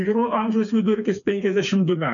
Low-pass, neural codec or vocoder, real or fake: 7.2 kHz; codec, 16 kHz, 4 kbps, FreqCodec, smaller model; fake